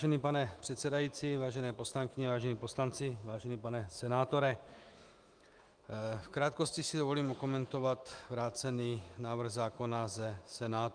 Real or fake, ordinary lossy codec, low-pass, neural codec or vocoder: fake; Opus, 32 kbps; 9.9 kHz; autoencoder, 48 kHz, 128 numbers a frame, DAC-VAE, trained on Japanese speech